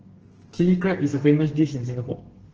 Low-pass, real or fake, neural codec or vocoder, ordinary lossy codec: 7.2 kHz; fake; codec, 32 kHz, 1.9 kbps, SNAC; Opus, 16 kbps